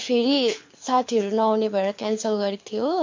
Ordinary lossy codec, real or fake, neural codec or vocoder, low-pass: MP3, 48 kbps; fake; codec, 24 kHz, 6 kbps, HILCodec; 7.2 kHz